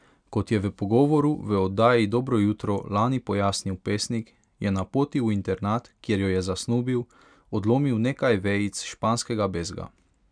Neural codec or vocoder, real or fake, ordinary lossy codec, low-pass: none; real; none; 9.9 kHz